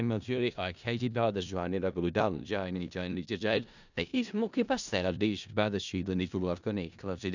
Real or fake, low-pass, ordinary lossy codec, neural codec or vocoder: fake; 7.2 kHz; none; codec, 16 kHz in and 24 kHz out, 0.4 kbps, LongCat-Audio-Codec, four codebook decoder